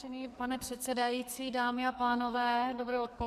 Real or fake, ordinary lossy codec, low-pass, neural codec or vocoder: fake; MP3, 96 kbps; 14.4 kHz; codec, 44.1 kHz, 2.6 kbps, SNAC